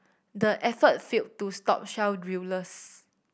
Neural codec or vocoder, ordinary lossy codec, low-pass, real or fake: none; none; none; real